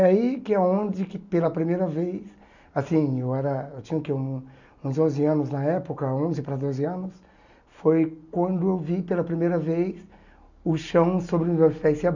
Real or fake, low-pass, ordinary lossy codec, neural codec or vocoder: real; 7.2 kHz; none; none